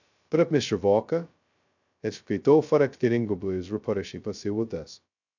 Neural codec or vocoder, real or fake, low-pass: codec, 16 kHz, 0.2 kbps, FocalCodec; fake; 7.2 kHz